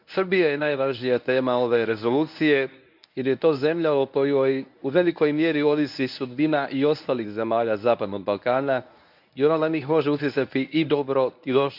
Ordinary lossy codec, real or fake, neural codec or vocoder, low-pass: none; fake; codec, 24 kHz, 0.9 kbps, WavTokenizer, medium speech release version 1; 5.4 kHz